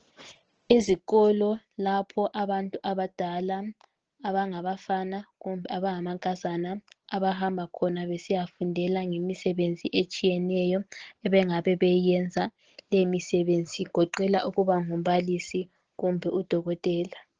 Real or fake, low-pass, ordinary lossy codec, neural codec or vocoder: real; 7.2 kHz; Opus, 16 kbps; none